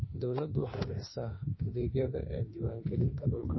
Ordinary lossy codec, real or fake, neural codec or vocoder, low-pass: MP3, 24 kbps; fake; autoencoder, 48 kHz, 32 numbers a frame, DAC-VAE, trained on Japanese speech; 7.2 kHz